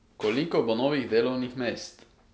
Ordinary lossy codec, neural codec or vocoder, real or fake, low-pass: none; none; real; none